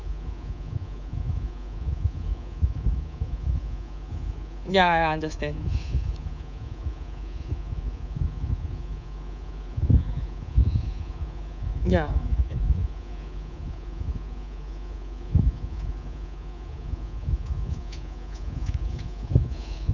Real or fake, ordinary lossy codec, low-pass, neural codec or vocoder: fake; none; 7.2 kHz; codec, 24 kHz, 3.1 kbps, DualCodec